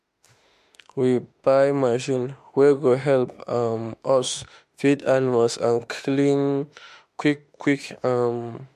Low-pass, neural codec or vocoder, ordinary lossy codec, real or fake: 14.4 kHz; autoencoder, 48 kHz, 32 numbers a frame, DAC-VAE, trained on Japanese speech; MP3, 64 kbps; fake